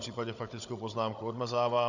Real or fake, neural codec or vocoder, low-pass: fake; codec, 16 kHz, 16 kbps, FunCodec, trained on Chinese and English, 50 frames a second; 7.2 kHz